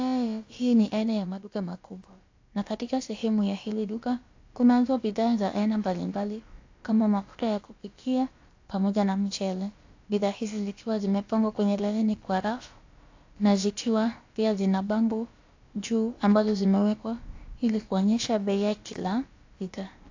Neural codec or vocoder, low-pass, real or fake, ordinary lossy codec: codec, 16 kHz, about 1 kbps, DyCAST, with the encoder's durations; 7.2 kHz; fake; AAC, 48 kbps